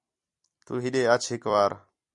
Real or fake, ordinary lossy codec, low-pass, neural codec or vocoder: fake; MP3, 96 kbps; 10.8 kHz; vocoder, 44.1 kHz, 128 mel bands every 256 samples, BigVGAN v2